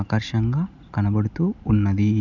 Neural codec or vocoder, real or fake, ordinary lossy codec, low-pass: none; real; none; 7.2 kHz